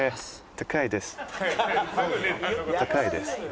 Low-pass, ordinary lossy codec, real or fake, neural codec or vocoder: none; none; real; none